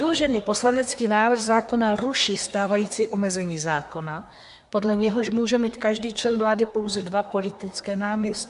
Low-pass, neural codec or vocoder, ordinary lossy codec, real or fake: 10.8 kHz; codec, 24 kHz, 1 kbps, SNAC; AAC, 96 kbps; fake